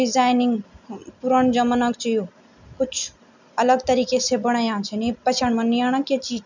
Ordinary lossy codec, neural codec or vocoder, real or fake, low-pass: none; none; real; 7.2 kHz